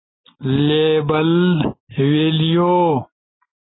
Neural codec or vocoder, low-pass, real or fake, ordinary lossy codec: none; 7.2 kHz; real; AAC, 16 kbps